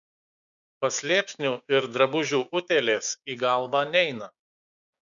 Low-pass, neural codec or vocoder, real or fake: 7.2 kHz; codec, 16 kHz, 6 kbps, DAC; fake